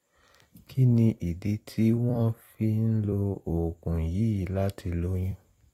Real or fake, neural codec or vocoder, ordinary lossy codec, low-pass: fake; vocoder, 44.1 kHz, 128 mel bands every 256 samples, BigVGAN v2; AAC, 48 kbps; 19.8 kHz